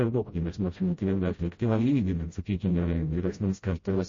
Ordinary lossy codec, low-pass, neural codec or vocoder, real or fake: MP3, 32 kbps; 7.2 kHz; codec, 16 kHz, 0.5 kbps, FreqCodec, smaller model; fake